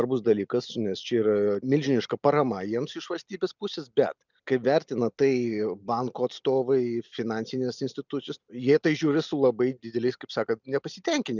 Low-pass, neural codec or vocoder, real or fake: 7.2 kHz; none; real